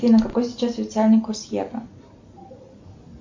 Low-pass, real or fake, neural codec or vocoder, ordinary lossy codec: 7.2 kHz; real; none; MP3, 64 kbps